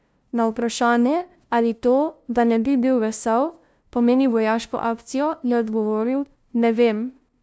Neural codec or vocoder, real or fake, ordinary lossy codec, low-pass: codec, 16 kHz, 0.5 kbps, FunCodec, trained on LibriTTS, 25 frames a second; fake; none; none